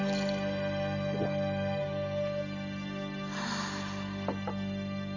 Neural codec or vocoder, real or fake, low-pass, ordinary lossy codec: none; real; 7.2 kHz; none